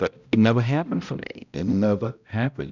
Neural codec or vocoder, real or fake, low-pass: codec, 16 kHz, 0.5 kbps, X-Codec, HuBERT features, trained on balanced general audio; fake; 7.2 kHz